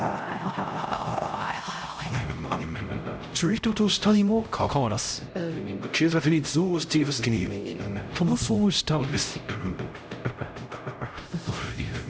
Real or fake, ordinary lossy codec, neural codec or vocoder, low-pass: fake; none; codec, 16 kHz, 0.5 kbps, X-Codec, HuBERT features, trained on LibriSpeech; none